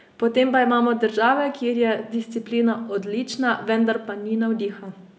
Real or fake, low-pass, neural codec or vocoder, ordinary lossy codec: real; none; none; none